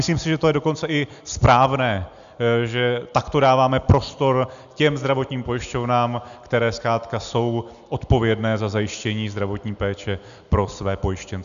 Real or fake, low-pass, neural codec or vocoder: real; 7.2 kHz; none